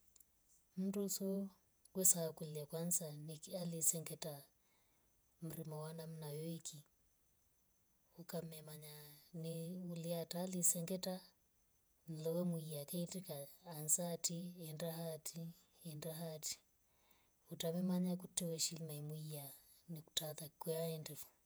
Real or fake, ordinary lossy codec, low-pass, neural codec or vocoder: fake; none; none; vocoder, 48 kHz, 128 mel bands, Vocos